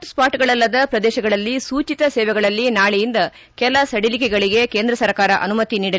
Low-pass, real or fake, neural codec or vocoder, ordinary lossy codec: none; real; none; none